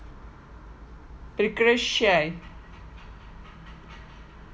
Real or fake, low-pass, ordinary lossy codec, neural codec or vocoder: real; none; none; none